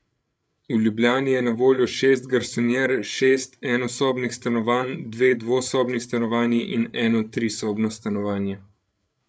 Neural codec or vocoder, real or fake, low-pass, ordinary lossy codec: codec, 16 kHz, 8 kbps, FreqCodec, larger model; fake; none; none